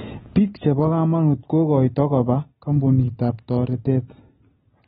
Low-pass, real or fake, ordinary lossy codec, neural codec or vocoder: 19.8 kHz; real; AAC, 16 kbps; none